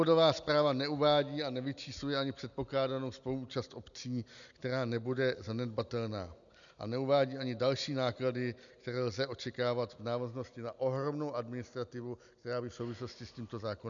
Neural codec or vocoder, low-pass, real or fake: none; 7.2 kHz; real